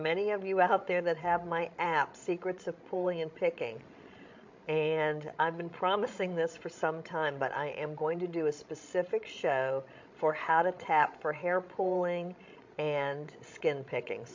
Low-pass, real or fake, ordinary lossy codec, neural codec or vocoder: 7.2 kHz; fake; MP3, 48 kbps; codec, 16 kHz, 16 kbps, FreqCodec, larger model